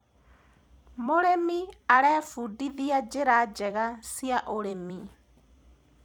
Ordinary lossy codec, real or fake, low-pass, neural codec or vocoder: none; fake; none; vocoder, 44.1 kHz, 128 mel bands every 256 samples, BigVGAN v2